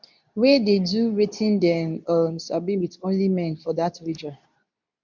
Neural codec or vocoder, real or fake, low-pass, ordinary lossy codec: codec, 24 kHz, 0.9 kbps, WavTokenizer, medium speech release version 2; fake; 7.2 kHz; none